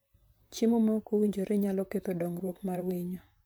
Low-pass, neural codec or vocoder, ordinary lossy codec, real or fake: none; vocoder, 44.1 kHz, 128 mel bands, Pupu-Vocoder; none; fake